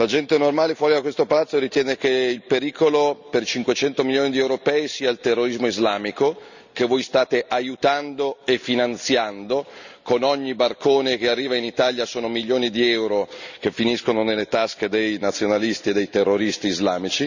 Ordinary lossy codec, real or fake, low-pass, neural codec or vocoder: none; real; 7.2 kHz; none